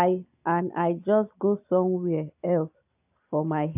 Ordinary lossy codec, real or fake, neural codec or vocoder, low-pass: AAC, 32 kbps; real; none; 3.6 kHz